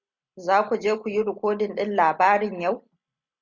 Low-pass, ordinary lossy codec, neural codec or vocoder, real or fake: 7.2 kHz; Opus, 64 kbps; none; real